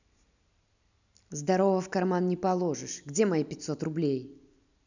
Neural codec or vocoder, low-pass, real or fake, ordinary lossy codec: none; 7.2 kHz; real; none